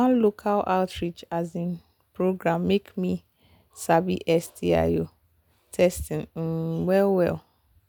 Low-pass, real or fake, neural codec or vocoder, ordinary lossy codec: none; real; none; none